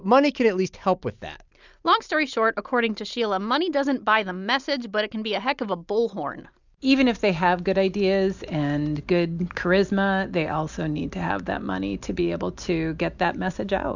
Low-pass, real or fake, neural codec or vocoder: 7.2 kHz; real; none